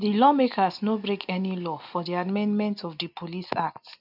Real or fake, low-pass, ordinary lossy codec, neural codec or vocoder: real; 5.4 kHz; none; none